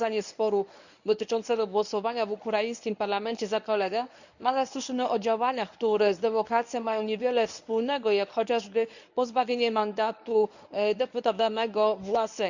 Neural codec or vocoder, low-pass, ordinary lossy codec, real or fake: codec, 24 kHz, 0.9 kbps, WavTokenizer, medium speech release version 1; 7.2 kHz; none; fake